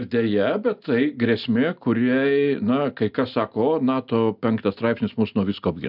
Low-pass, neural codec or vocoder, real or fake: 5.4 kHz; none; real